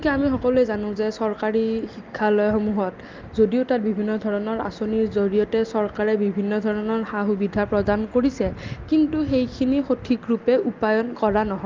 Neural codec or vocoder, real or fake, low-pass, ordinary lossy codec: none; real; 7.2 kHz; Opus, 32 kbps